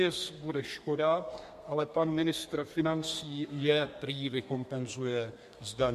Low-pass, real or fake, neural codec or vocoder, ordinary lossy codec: 14.4 kHz; fake; codec, 32 kHz, 1.9 kbps, SNAC; MP3, 64 kbps